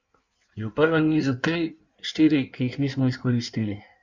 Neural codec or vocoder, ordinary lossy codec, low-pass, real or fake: codec, 16 kHz in and 24 kHz out, 1.1 kbps, FireRedTTS-2 codec; Opus, 64 kbps; 7.2 kHz; fake